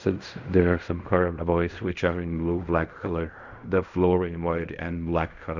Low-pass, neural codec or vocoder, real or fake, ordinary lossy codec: 7.2 kHz; codec, 16 kHz in and 24 kHz out, 0.4 kbps, LongCat-Audio-Codec, fine tuned four codebook decoder; fake; none